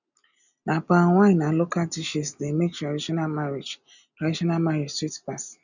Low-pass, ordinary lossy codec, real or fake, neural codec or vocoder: 7.2 kHz; none; real; none